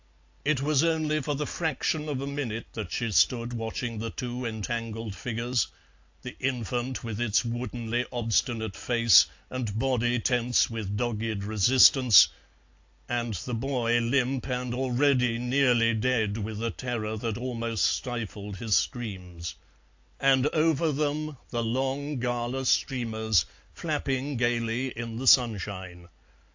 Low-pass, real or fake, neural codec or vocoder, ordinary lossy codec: 7.2 kHz; real; none; AAC, 48 kbps